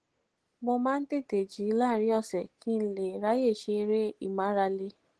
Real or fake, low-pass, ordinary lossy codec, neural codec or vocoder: real; 10.8 kHz; Opus, 16 kbps; none